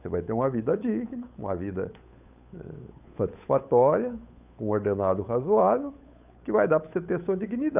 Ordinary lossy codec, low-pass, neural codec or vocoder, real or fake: none; 3.6 kHz; codec, 16 kHz, 8 kbps, FunCodec, trained on Chinese and English, 25 frames a second; fake